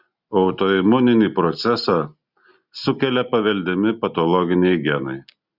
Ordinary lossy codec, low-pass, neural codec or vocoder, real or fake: Opus, 64 kbps; 5.4 kHz; none; real